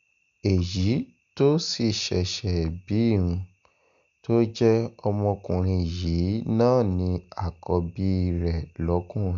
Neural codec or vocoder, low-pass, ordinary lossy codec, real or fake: none; 7.2 kHz; none; real